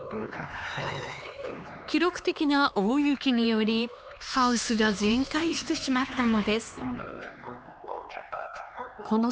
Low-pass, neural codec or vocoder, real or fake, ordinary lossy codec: none; codec, 16 kHz, 2 kbps, X-Codec, HuBERT features, trained on LibriSpeech; fake; none